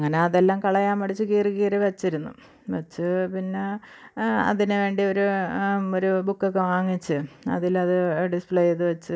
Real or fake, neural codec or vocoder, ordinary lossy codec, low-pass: real; none; none; none